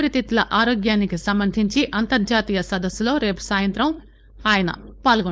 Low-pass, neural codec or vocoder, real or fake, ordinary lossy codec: none; codec, 16 kHz, 4.8 kbps, FACodec; fake; none